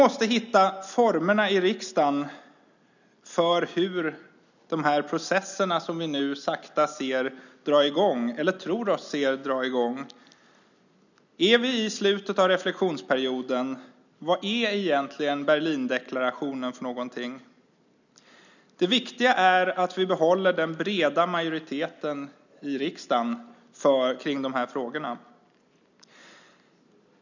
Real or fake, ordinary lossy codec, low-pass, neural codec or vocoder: real; none; 7.2 kHz; none